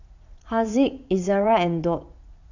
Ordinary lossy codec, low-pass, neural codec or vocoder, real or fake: none; 7.2 kHz; vocoder, 44.1 kHz, 80 mel bands, Vocos; fake